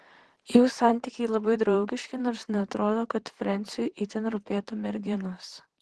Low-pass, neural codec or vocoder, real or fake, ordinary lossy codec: 10.8 kHz; vocoder, 44.1 kHz, 128 mel bands, Pupu-Vocoder; fake; Opus, 16 kbps